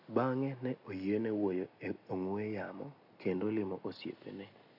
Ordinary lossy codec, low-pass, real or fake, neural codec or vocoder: none; 5.4 kHz; real; none